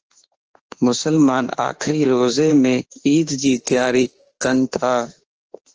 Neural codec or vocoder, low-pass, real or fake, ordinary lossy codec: autoencoder, 48 kHz, 32 numbers a frame, DAC-VAE, trained on Japanese speech; 7.2 kHz; fake; Opus, 16 kbps